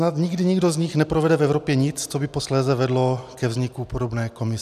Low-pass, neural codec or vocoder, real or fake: 14.4 kHz; none; real